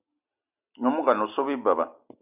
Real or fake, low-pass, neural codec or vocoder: real; 3.6 kHz; none